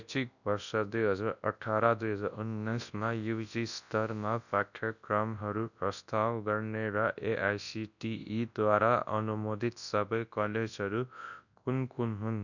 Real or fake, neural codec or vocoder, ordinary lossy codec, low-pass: fake; codec, 24 kHz, 0.9 kbps, WavTokenizer, large speech release; none; 7.2 kHz